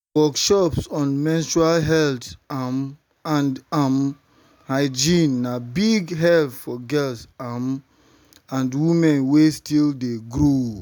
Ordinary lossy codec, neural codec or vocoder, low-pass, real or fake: none; none; none; real